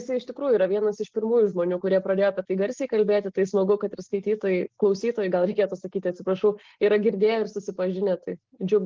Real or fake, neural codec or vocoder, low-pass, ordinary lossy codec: real; none; 7.2 kHz; Opus, 16 kbps